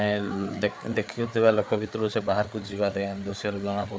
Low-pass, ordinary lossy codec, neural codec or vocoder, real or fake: none; none; codec, 16 kHz, 4 kbps, FreqCodec, larger model; fake